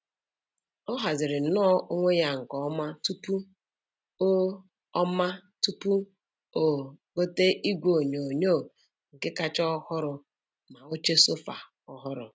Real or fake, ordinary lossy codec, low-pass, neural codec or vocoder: real; none; none; none